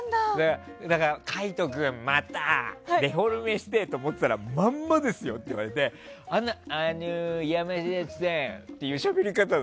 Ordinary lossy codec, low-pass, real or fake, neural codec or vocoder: none; none; real; none